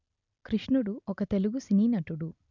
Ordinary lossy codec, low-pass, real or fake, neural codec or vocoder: none; 7.2 kHz; real; none